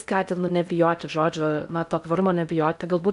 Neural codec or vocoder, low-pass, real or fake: codec, 16 kHz in and 24 kHz out, 0.6 kbps, FocalCodec, streaming, 4096 codes; 10.8 kHz; fake